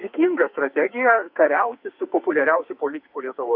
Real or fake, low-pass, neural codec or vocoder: fake; 5.4 kHz; codec, 44.1 kHz, 2.6 kbps, SNAC